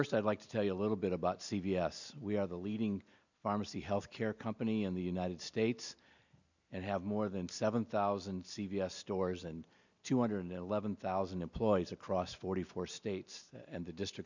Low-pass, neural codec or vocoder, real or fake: 7.2 kHz; none; real